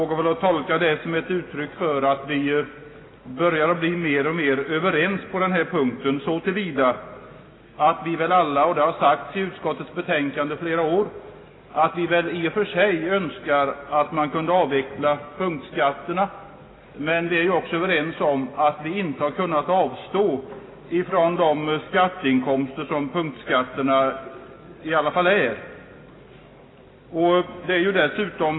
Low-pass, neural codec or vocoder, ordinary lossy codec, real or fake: 7.2 kHz; none; AAC, 16 kbps; real